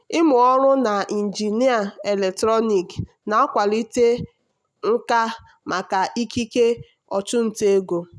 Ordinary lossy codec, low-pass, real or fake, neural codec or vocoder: none; none; real; none